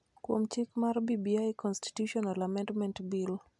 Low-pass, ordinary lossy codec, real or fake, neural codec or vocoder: 10.8 kHz; MP3, 96 kbps; real; none